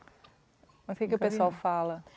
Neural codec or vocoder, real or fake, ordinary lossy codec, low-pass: none; real; none; none